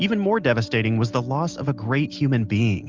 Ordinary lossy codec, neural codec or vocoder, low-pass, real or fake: Opus, 32 kbps; none; 7.2 kHz; real